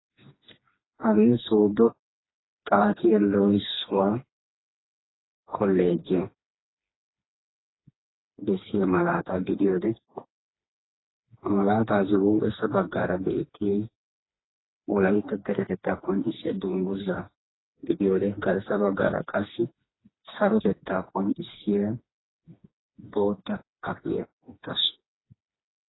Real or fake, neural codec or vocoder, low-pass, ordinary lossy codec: fake; codec, 16 kHz, 2 kbps, FreqCodec, smaller model; 7.2 kHz; AAC, 16 kbps